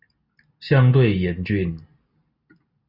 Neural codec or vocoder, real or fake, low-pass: none; real; 5.4 kHz